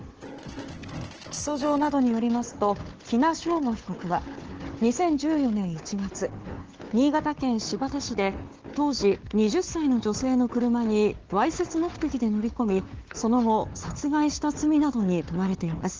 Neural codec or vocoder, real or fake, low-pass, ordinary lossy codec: codec, 16 kHz, 4 kbps, FunCodec, trained on Chinese and English, 50 frames a second; fake; 7.2 kHz; Opus, 16 kbps